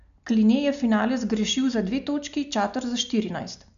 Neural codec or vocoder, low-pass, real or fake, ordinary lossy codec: none; 7.2 kHz; real; MP3, 96 kbps